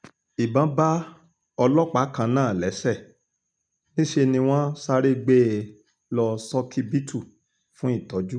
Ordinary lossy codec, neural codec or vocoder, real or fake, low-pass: none; none; real; 9.9 kHz